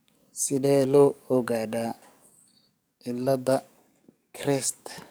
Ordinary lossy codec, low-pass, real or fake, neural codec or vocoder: none; none; fake; codec, 44.1 kHz, 7.8 kbps, DAC